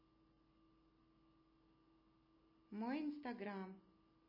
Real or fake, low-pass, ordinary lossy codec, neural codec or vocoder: real; 5.4 kHz; MP3, 24 kbps; none